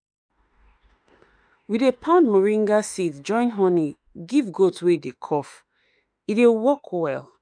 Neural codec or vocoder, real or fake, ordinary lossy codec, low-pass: autoencoder, 48 kHz, 32 numbers a frame, DAC-VAE, trained on Japanese speech; fake; none; 9.9 kHz